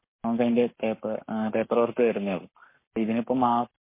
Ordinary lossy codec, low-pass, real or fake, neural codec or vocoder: MP3, 24 kbps; 3.6 kHz; fake; codec, 44.1 kHz, 7.8 kbps, Pupu-Codec